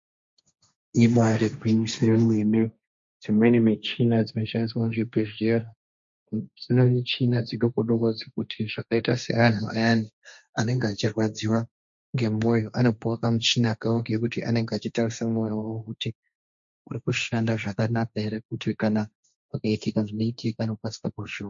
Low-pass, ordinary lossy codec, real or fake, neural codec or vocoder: 7.2 kHz; MP3, 48 kbps; fake; codec, 16 kHz, 1.1 kbps, Voila-Tokenizer